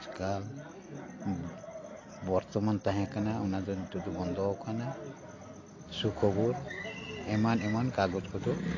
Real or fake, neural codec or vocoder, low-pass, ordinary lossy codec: real; none; 7.2 kHz; AAC, 32 kbps